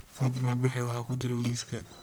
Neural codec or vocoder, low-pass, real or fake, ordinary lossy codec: codec, 44.1 kHz, 1.7 kbps, Pupu-Codec; none; fake; none